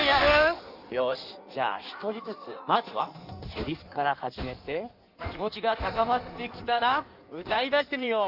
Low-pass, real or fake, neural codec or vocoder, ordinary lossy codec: 5.4 kHz; fake; codec, 16 kHz in and 24 kHz out, 1.1 kbps, FireRedTTS-2 codec; none